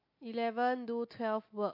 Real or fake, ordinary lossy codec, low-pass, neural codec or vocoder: real; MP3, 32 kbps; 5.4 kHz; none